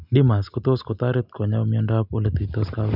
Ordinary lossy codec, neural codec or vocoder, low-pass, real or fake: none; none; 5.4 kHz; real